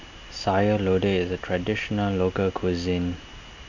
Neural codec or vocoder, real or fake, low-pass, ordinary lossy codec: none; real; 7.2 kHz; none